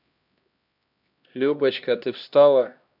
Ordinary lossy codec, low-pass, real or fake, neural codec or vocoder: none; 5.4 kHz; fake; codec, 16 kHz, 1 kbps, X-Codec, HuBERT features, trained on LibriSpeech